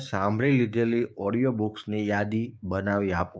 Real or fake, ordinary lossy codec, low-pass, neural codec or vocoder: fake; none; none; codec, 16 kHz, 6 kbps, DAC